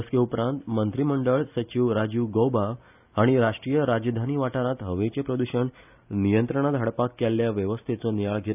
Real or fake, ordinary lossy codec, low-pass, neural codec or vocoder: real; none; 3.6 kHz; none